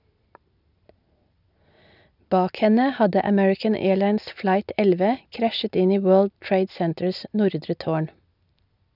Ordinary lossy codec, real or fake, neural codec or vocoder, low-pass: none; real; none; 5.4 kHz